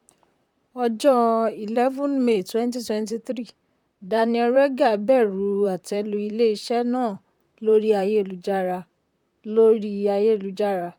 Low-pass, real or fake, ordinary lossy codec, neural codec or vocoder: 19.8 kHz; fake; none; vocoder, 44.1 kHz, 128 mel bands, Pupu-Vocoder